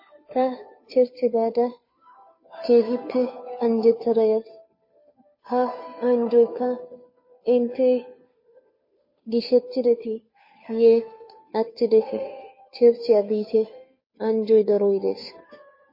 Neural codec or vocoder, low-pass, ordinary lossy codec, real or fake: codec, 16 kHz, 2 kbps, FunCodec, trained on Chinese and English, 25 frames a second; 5.4 kHz; MP3, 24 kbps; fake